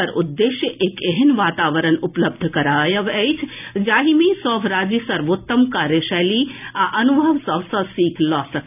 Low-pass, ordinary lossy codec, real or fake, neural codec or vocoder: 3.6 kHz; none; real; none